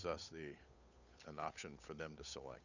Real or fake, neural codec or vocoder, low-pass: fake; codec, 16 kHz, 8 kbps, FunCodec, trained on Chinese and English, 25 frames a second; 7.2 kHz